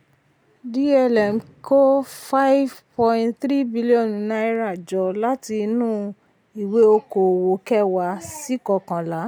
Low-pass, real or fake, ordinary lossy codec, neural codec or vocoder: none; real; none; none